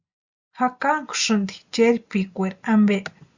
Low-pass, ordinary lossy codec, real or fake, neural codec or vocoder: 7.2 kHz; Opus, 64 kbps; real; none